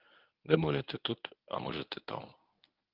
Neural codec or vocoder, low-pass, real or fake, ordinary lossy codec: codec, 24 kHz, 0.9 kbps, WavTokenizer, medium speech release version 1; 5.4 kHz; fake; Opus, 24 kbps